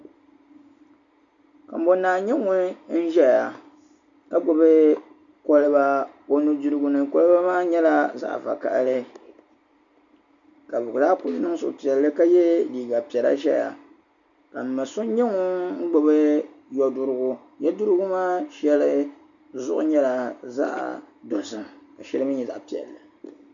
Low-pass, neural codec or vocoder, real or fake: 7.2 kHz; none; real